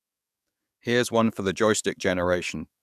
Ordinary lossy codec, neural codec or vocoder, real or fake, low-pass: none; codec, 44.1 kHz, 7.8 kbps, DAC; fake; 14.4 kHz